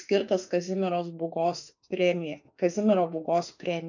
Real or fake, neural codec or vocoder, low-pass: fake; autoencoder, 48 kHz, 32 numbers a frame, DAC-VAE, trained on Japanese speech; 7.2 kHz